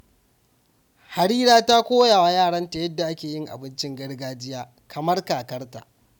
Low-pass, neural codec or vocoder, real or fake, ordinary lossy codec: 19.8 kHz; none; real; none